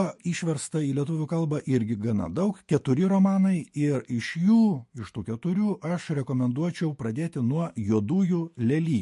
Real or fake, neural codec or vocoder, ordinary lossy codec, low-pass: fake; autoencoder, 48 kHz, 128 numbers a frame, DAC-VAE, trained on Japanese speech; MP3, 48 kbps; 14.4 kHz